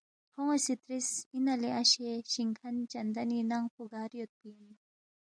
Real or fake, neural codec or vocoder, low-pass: real; none; 9.9 kHz